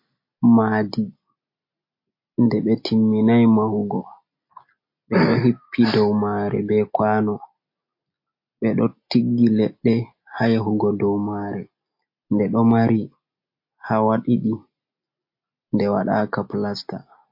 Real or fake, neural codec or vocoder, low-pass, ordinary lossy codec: real; none; 5.4 kHz; MP3, 32 kbps